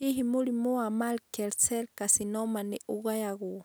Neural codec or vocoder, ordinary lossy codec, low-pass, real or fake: none; none; none; real